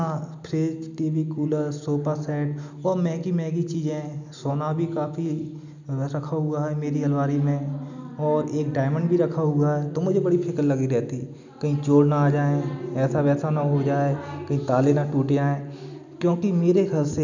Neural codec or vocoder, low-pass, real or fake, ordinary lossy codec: none; 7.2 kHz; real; none